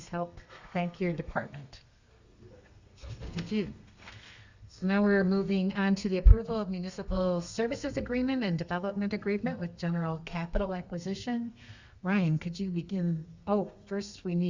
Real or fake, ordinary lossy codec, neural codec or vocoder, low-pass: fake; Opus, 64 kbps; codec, 32 kHz, 1.9 kbps, SNAC; 7.2 kHz